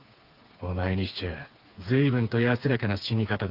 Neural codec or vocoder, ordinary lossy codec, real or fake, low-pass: codec, 16 kHz, 4 kbps, FreqCodec, smaller model; Opus, 32 kbps; fake; 5.4 kHz